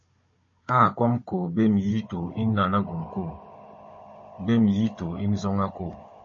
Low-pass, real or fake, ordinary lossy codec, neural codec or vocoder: 7.2 kHz; fake; MP3, 32 kbps; codec, 16 kHz, 16 kbps, FunCodec, trained on Chinese and English, 50 frames a second